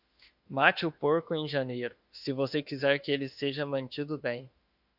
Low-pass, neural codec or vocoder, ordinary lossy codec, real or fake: 5.4 kHz; autoencoder, 48 kHz, 32 numbers a frame, DAC-VAE, trained on Japanese speech; Opus, 64 kbps; fake